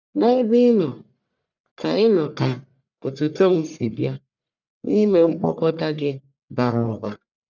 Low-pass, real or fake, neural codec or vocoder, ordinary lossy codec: 7.2 kHz; fake; codec, 44.1 kHz, 1.7 kbps, Pupu-Codec; none